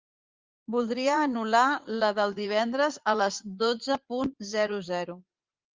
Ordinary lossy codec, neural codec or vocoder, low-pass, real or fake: Opus, 32 kbps; vocoder, 44.1 kHz, 80 mel bands, Vocos; 7.2 kHz; fake